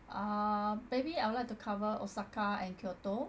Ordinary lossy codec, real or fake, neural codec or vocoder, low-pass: none; real; none; none